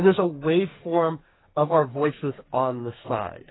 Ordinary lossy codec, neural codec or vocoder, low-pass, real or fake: AAC, 16 kbps; codec, 44.1 kHz, 2.6 kbps, SNAC; 7.2 kHz; fake